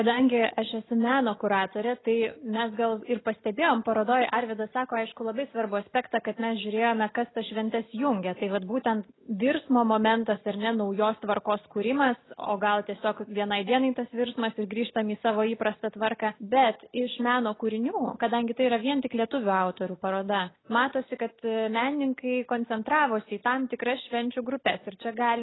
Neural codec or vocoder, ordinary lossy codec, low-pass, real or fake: none; AAC, 16 kbps; 7.2 kHz; real